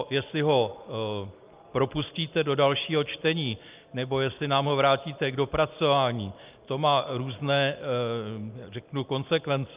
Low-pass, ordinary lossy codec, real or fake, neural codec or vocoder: 3.6 kHz; Opus, 24 kbps; real; none